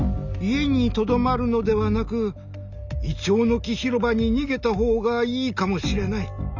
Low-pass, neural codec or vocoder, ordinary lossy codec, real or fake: 7.2 kHz; none; none; real